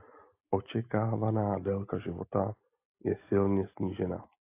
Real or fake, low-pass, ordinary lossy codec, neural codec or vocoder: real; 3.6 kHz; AAC, 24 kbps; none